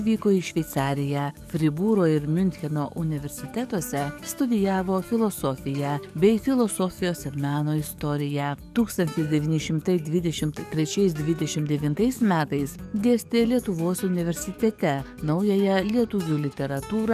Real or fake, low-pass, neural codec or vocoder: fake; 14.4 kHz; codec, 44.1 kHz, 7.8 kbps, DAC